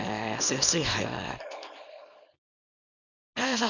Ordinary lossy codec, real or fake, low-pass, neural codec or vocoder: none; fake; 7.2 kHz; codec, 24 kHz, 0.9 kbps, WavTokenizer, small release